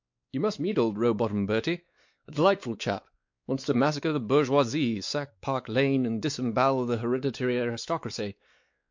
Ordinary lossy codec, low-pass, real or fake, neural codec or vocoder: MP3, 48 kbps; 7.2 kHz; fake; codec, 16 kHz, 4 kbps, X-Codec, WavLM features, trained on Multilingual LibriSpeech